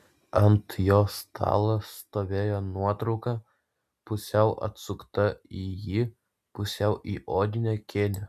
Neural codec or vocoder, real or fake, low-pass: none; real; 14.4 kHz